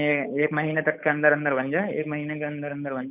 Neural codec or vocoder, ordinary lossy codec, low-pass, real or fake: codec, 16 kHz, 8 kbps, FunCodec, trained on Chinese and English, 25 frames a second; none; 3.6 kHz; fake